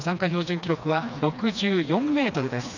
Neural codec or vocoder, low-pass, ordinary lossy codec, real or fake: codec, 16 kHz, 2 kbps, FreqCodec, smaller model; 7.2 kHz; none; fake